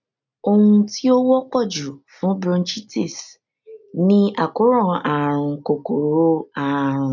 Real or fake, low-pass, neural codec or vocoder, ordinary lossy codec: real; 7.2 kHz; none; none